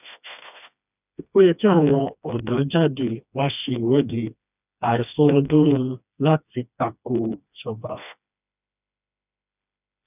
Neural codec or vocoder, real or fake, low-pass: codec, 16 kHz, 2 kbps, FreqCodec, smaller model; fake; 3.6 kHz